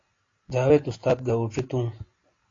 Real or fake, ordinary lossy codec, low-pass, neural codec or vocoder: real; AAC, 32 kbps; 7.2 kHz; none